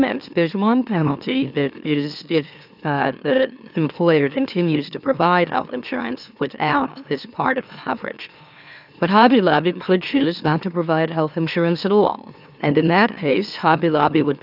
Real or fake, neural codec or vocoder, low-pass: fake; autoencoder, 44.1 kHz, a latent of 192 numbers a frame, MeloTTS; 5.4 kHz